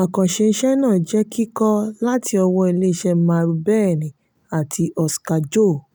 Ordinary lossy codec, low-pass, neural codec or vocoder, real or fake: none; none; none; real